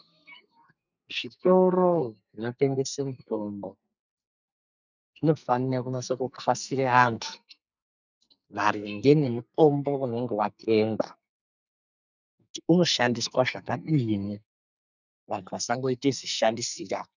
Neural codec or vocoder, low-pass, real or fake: codec, 44.1 kHz, 2.6 kbps, SNAC; 7.2 kHz; fake